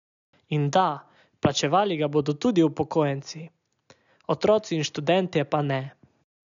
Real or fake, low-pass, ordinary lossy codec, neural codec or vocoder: real; 7.2 kHz; none; none